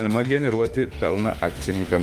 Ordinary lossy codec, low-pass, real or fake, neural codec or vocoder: Opus, 32 kbps; 14.4 kHz; fake; autoencoder, 48 kHz, 32 numbers a frame, DAC-VAE, trained on Japanese speech